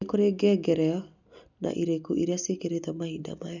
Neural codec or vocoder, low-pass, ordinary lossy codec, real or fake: none; 7.2 kHz; none; real